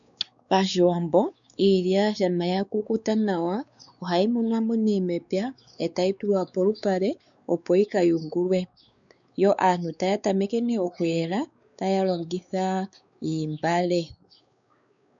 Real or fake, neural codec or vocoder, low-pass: fake; codec, 16 kHz, 4 kbps, X-Codec, WavLM features, trained on Multilingual LibriSpeech; 7.2 kHz